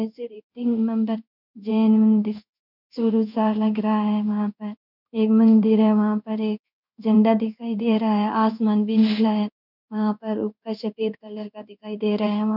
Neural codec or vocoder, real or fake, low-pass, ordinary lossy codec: codec, 16 kHz in and 24 kHz out, 1 kbps, XY-Tokenizer; fake; 5.4 kHz; none